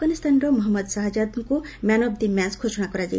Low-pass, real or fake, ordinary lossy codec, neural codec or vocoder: none; real; none; none